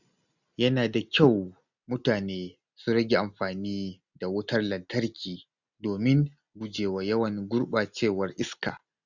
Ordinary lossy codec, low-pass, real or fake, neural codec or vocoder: none; 7.2 kHz; real; none